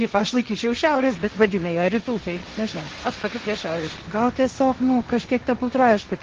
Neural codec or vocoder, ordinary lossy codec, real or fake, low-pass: codec, 16 kHz, 1.1 kbps, Voila-Tokenizer; Opus, 16 kbps; fake; 7.2 kHz